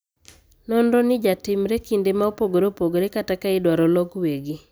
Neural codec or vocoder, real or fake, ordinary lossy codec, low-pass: none; real; none; none